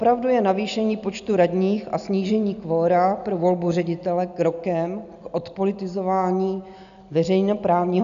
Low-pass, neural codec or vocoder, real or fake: 7.2 kHz; none; real